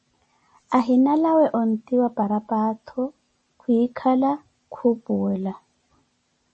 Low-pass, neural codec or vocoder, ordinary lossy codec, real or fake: 10.8 kHz; none; MP3, 32 kbps; real